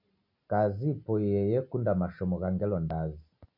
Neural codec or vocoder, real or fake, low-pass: none; real; 5.4 kHz